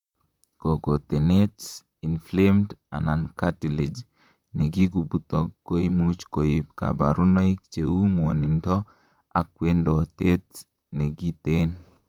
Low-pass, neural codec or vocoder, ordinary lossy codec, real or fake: 19.8 kHz; vocoder, 44.1 kHz, 128 mel bands, Pupu-Vocoder; none; fake